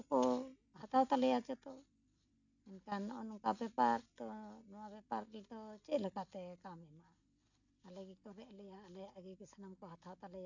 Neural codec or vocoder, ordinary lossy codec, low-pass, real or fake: none; none; 7.2 kHz; real